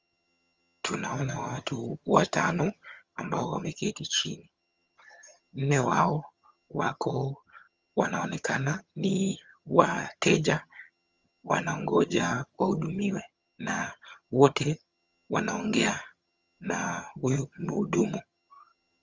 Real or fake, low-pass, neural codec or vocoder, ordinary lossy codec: fake; 7.2 kHz; vocoder, 22.05 kHz, 80 mel bands, HiFi-GAN; Opus, 32 kbps